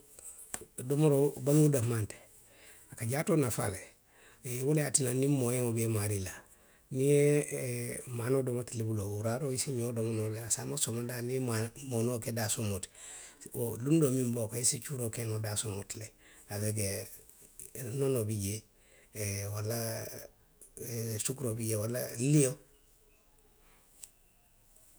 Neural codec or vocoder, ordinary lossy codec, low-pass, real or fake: autoencoder, 48 kHz, 128 numbers a frame, DAC-VAE, trained on Japanese speech; none; none; fake